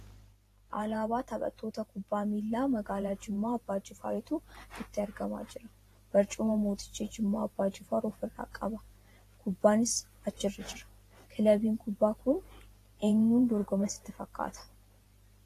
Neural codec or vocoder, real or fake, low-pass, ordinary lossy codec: vocoder, 44.1 kHz, 128 mel bands every 512 samples, BigVGAN v2; fake; 14.4 kHz; AAC, 48 kbps